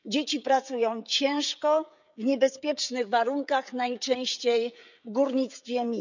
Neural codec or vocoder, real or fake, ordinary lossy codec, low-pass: codec, 16 kHz, 16 kbps, FreqCodec, smaller model; fake; none; 7.2 kHz